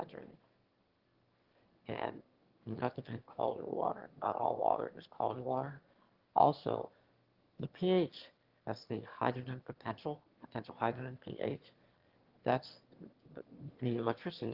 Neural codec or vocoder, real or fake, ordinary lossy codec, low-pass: autoencoder, 22.05 kHz, a latent of 192 numbers a frame, VITS, trained on one speaker; fake; Opus, 16 kbps; 5.4 kHz